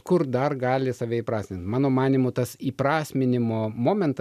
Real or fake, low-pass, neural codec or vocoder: real; 14.4 kHz; none